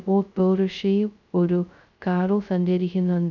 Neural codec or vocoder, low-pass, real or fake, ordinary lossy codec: codec, 16 kHz, 0.2 kbps, FocalCodec; 7.2 kHz; fake; none